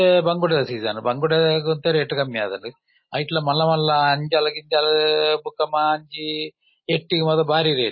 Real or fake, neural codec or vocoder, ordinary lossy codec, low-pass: real; none; MP3, 24 kbps; 7.2 kHz